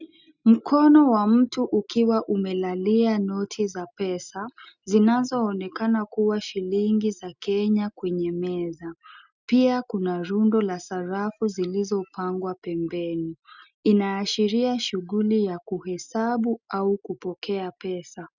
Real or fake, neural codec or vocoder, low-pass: real; none; 7.2 kHz